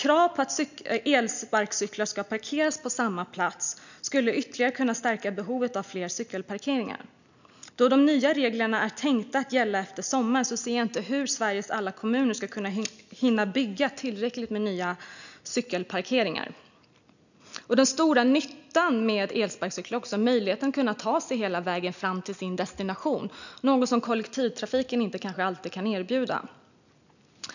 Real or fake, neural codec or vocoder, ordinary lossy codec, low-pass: real; none; none; 7.2 kHz